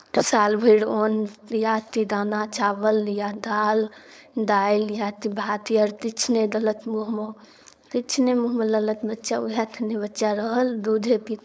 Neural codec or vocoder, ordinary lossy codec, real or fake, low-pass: codec, 16 kHz, 4.8 kbps, FACodec; none; fake; none